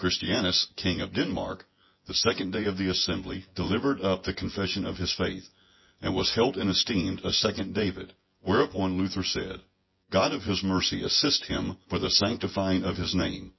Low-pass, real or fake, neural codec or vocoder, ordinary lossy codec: 7.2 kHz; fake; vocoder, 24 kHz, 100 mel bands, Vocos; MP3, 24 kbps